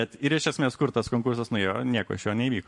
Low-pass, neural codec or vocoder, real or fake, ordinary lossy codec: 10.8 kHz; vocoder, 44.1 kHz, 128 mel bands every 512 samples, BigVGAN v2; fake; MP3, 48 kbps